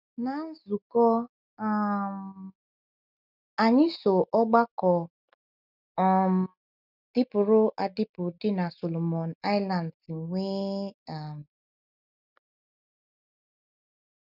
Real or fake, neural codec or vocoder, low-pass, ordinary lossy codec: real; none; 5.4 kHz; none